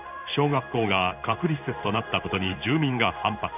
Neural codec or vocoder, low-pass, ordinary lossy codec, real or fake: codec, 16 kHz in and 24 kHz out, 1 kbps, XY-Tokenizer; 3.6 kHz; none; fake